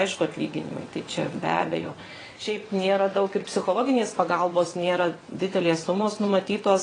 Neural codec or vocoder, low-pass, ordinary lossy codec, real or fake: vocoder, 22.05 kHz, 80 mel bands, WaveNeXt; 9.9 kHz; AAC, 32 kbps; fake